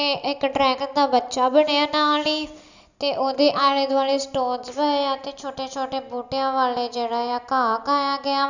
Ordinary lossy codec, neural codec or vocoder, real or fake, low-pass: none; none; real; 7.2 kHz